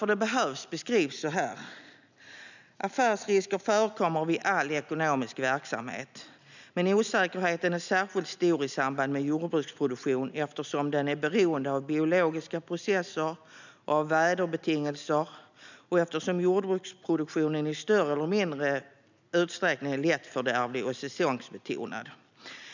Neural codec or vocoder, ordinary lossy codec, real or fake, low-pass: none; none; real; 7.2 kHz